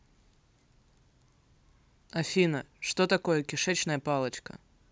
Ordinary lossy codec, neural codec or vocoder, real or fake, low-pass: none; none; real; none